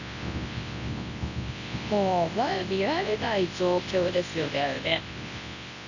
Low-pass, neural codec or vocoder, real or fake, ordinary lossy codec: 7.2 kHz; codec, 24 kHz, 0.9 kbps, WavTokenizer, large speech release; fake; none